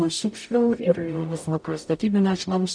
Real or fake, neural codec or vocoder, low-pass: fake; codec, 44.1 kHz, 0.9 kbps, DAC; 9.9 kHz